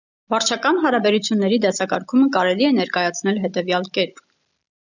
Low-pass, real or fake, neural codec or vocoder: 7.2 kHz; real; none